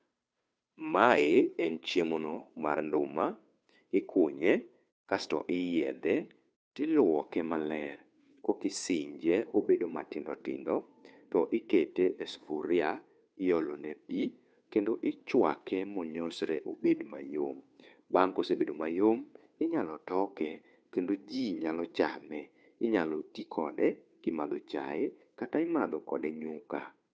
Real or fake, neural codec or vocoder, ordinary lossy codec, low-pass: fake; codec, 16 kHz, 2 kbps, FunCodec, trained on Chinese and English, 25 frames a second; none; none